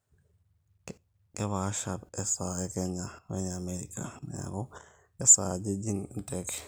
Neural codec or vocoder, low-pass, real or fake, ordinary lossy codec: none; none; real; none